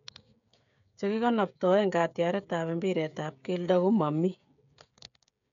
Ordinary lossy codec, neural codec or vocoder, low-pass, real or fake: none; codec, 16 kHz, 16 kbps, FreqCodec, smaller model; 7.2 kHz; fake